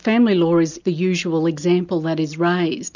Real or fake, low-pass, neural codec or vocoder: real; 7.2 kHz; none